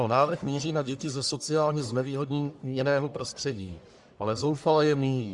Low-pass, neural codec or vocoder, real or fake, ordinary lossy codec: 10.8 kHz; codec, 44.1 kHz, 1.7 kbps, Pupu-Codec; fake; Opus, 64 kbps